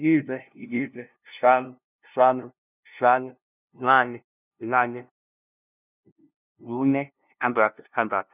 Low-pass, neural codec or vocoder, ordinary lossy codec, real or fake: 3.6 kHz; codec, 16 kHz, 0.5 kbps, FunCodec, trained on LibriTTS, 25 frames a second; none; fake